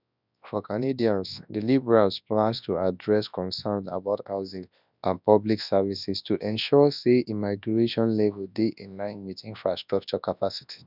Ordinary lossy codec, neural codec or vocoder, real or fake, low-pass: none; codec, 24 kHz, 0.9 kbps, WavTokenizer, large speech release; fake; 5.4 kHz